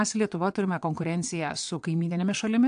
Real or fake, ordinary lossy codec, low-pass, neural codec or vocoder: fake; AAC, 64 kbps; 9.9 kHz; vocoder, 22.05 kHz, 80 mel bands, WaveNeXt